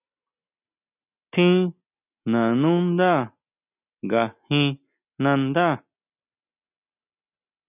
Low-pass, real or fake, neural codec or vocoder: 3.6 kHz; real; none